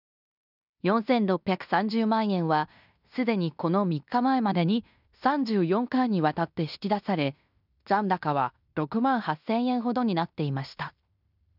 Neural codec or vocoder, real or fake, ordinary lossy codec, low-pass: codec, 16 kHz in and 24 kHz out, 0.9 kbps, LongCat-Audio-Codec, fine tuned four codebook decoder; fake; none; 5.4 kHz